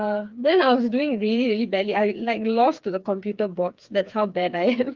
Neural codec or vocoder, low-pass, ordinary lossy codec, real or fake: codec, 16 kHz, 4 kbps, FreqCodec, smaller model; 7.2 kHz; Opus, 32 kbps; fake